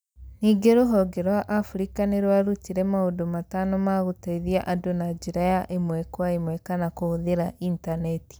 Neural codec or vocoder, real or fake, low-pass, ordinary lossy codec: none; real; none; none